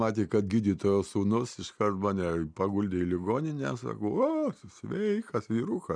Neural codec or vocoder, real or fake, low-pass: none; real; 9.9 kHz